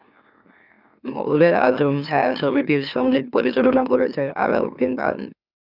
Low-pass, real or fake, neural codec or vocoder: 5.4 kHz; fake; autoencoder, 44.1 kHz, a latent of 192 numbers a frame, MeloTTS